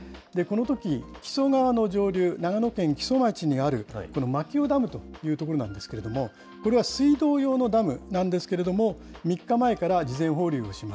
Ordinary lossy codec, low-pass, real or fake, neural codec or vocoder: none; none; real; none